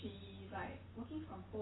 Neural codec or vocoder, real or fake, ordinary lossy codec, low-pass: none; real; AAC, 16 kbps; 7.2 kHz